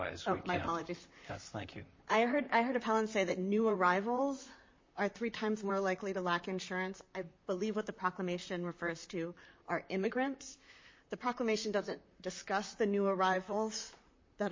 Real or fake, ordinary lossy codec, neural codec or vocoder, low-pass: fake; MP3, 32 kbps; vocoder, 44.1 kHz, 128 mel bands, Pupu-Vocoder; 7.2 kHz